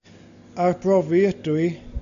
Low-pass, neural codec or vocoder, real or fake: 7.2 kHz; none; real